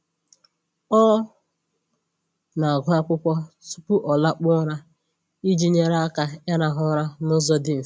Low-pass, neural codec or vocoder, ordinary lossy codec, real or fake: none; none; none; real